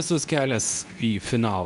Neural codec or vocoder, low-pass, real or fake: codec, 24 kHz, 0.9 kbps, WavTokenizer, medium speech release version 2; 10.8 kHz; fake